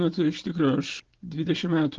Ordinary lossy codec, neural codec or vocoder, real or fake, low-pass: Opus, 16 kbps; none; real; 7.2 kHz